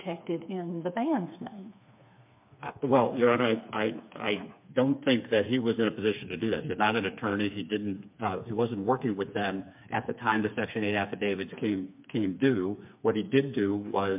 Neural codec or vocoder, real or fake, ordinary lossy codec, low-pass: codec, 16 kHz, 4 kbps, FreqCodec, smaller model; fake; MP3, 32 kbps; 3.6 kHz